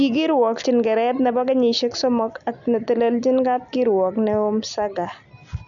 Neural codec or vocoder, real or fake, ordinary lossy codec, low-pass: none; real; MP3, 96 kbps; 7.2 kHz